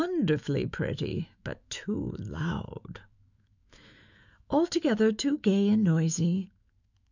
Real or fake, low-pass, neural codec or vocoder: fake; 7.2 kHz; autoencoder, 48 kHz, 128 numbers a frame, DAC-VAE, trained on Japanese speech